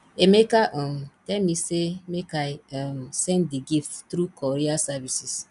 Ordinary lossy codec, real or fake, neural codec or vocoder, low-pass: none; real; none; 10.8 kHz